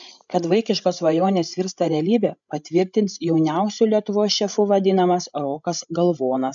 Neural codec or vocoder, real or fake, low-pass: codec, 16 kHz, 16 kbps, FreqCodec, larger model; fake; 7.2 kHz